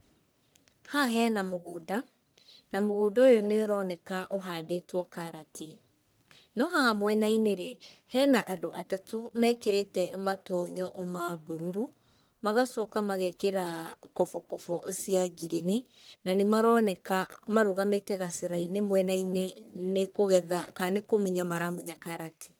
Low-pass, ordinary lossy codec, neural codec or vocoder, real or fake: none; none; codec, 44.1 kHz, 1.7 kbps, Pupu-Codec; fake